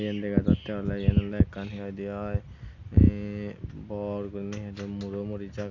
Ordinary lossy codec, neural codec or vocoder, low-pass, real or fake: Opus, 64 kbps; none; 7.2 kHz; real